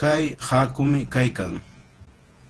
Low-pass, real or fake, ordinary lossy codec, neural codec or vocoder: 10.8 kHz; fake; Opus, 16 kbps; vocoder, 48 kHz, 128 mel bands, Vocos